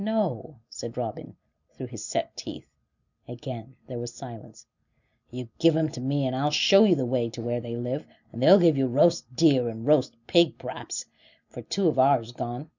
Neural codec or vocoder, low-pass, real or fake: none; 7.2 kHz; real